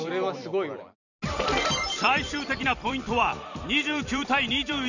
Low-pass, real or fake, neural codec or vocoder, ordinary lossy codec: 7.2 kHz; fake; vocoder, 44.1 kHz, 128 mel bands every 256 samples, BigVGAN v2; none